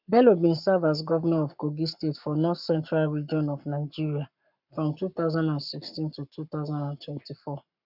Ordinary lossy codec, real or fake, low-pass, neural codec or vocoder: none; fake; 5.4 kHz; codec, 44.1 kHz, 7.8 kbps, Pupu-Codec